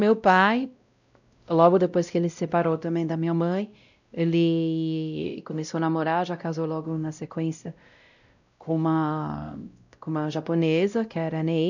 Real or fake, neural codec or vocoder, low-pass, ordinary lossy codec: fake; codec, 16 kHz, 0.5 kbps, X-Codec, WavLM features, trained on Multilingual LibriSpeech; 7.2 kHz; none